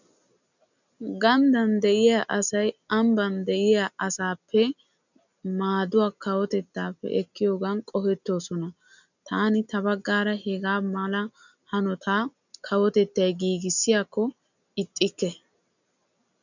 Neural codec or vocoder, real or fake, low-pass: none; real; 7.2 kHz